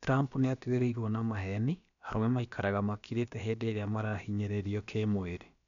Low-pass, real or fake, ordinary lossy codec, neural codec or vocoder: 7.2 kHz; fake; none; codec, 16 kHz, about 1 kbps, DyCAST, with the encoder's durations